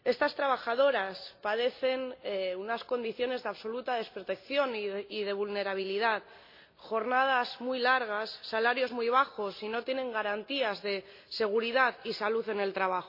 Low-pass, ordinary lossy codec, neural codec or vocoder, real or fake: 5.4 kHz; MP3, 32 kbps; none; real